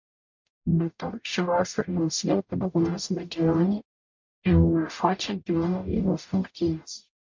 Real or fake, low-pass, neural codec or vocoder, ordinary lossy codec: fake; 7.2 kHz; codec, 44.1 kHz, 0.9 kbps, DAC; MP3, 48 kbps